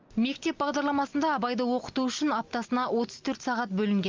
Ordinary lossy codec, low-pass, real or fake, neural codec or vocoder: Opus, 24 kbps; 7.2 kHz; real; none